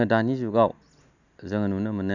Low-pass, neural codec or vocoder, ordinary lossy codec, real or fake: 7.2 kHz; none; none; real